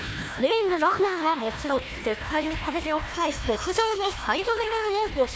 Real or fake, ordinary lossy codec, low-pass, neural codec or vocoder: fake; none; none; codec, 16 kHz, 1 kbps, FunCodec, trained on Chinese and English, 50 frames a second